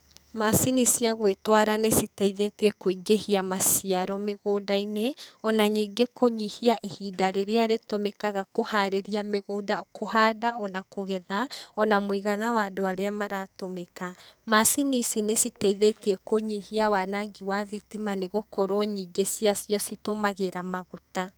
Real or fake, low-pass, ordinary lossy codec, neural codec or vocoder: fake; none; none; codec, 44.1 kHz, 2.6 kbps, SNAC